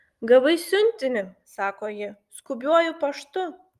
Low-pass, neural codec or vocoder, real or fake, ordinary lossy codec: 14.4 kHz; none; real; Opus, 32 kbps